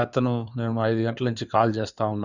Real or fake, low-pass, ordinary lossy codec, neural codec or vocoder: fake; 7.2 kHz; Opus, 64 kbps; codec, 16 kHz in and 24 kHz out, 2.2 kbps, FireRedTTS-2 codec